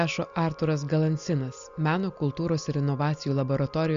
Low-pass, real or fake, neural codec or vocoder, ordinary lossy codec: 7.2 kHz; real; none; Opus, 64 kbps